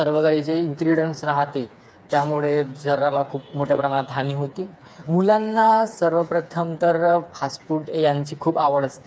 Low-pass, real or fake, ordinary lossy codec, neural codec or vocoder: none; fake; none; codec, 16 kHz, 4 kbps, FreqCodec, smaller model